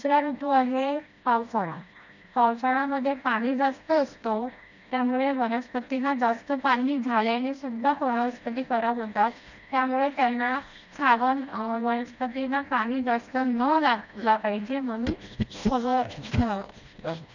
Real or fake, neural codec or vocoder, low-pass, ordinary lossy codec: fake; codec, 16 kHz, 1 kbps, FreqCodec, smaller model; 7.2 kHz; none